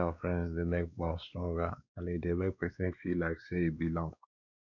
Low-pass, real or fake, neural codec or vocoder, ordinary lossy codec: 7.2 kHz; fake; codec, 16 kHz, 2 kbps, X-Codec, WavLM features, trained on Multilingual LibriSpeech; none